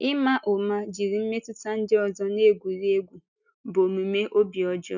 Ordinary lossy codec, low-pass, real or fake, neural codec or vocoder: none; 7.2 kHz; real; none